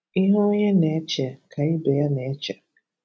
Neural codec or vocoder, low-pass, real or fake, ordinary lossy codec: none; none; real; none